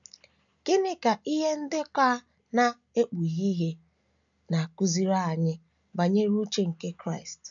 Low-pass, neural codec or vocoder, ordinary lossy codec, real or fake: 7.2 kHz; none; none; real